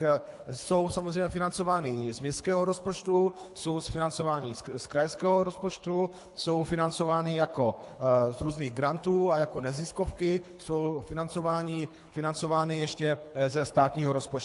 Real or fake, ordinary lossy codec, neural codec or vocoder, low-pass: fake; AAC, 64 kbps; codec, 24 kHz, 3 kbps, HILCodec; 10.8 kHz